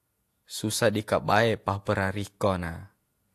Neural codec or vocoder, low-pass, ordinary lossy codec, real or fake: vocoder, 48 kHz, 128 mel bands, Vocos; 14.4 kHz; AAC, 64 kbps; fake